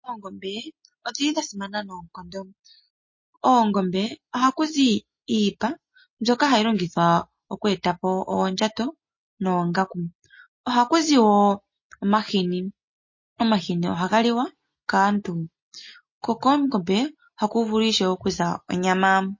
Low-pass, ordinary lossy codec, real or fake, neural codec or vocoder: 7.2 kHz; MP3, 32 kbps; real; none